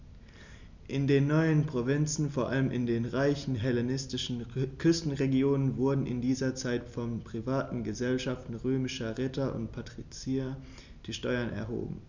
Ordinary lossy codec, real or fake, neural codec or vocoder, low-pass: none; real; none; 7.2 kHz